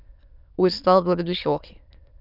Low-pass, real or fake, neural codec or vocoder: 5.4 kHz; fake; autoencoder, 22.05 kHz, a latent of 192 numbers a frame, VITS, trained on many speakers